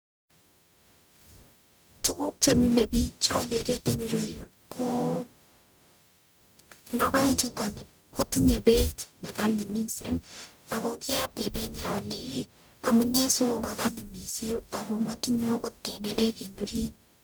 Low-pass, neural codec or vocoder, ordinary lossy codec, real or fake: none; codec, 44.1 kHz, 0.9 kbps, DAC; none; fake